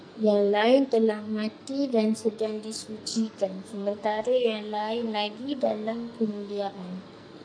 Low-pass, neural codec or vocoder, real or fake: 9.9 kHz; codec, 44.1 kHz, 2.6 kbps, SNAC; fake